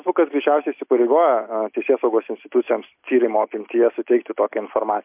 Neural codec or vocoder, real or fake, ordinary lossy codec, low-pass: none; real; MP3, 32 kbps; 3.6 kHz